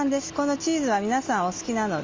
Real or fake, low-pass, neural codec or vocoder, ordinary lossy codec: real; 7.2 kHz; none; Opus, 32 kbps